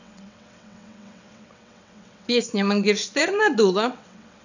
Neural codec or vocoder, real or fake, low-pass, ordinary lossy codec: vocoder, 22.05 kHz, 80 mel bands, WaveNeXt; fake; 7.2 kHz; none